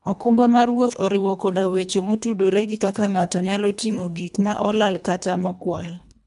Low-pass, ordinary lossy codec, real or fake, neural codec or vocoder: 10.8 kHz; none; fake; codec, 24 kHz, 1.5 kbps, HILCodec